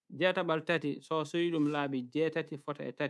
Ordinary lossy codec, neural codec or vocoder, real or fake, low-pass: none; codec, 24 kHz, 3.1 kbps, DualCodec; fake; none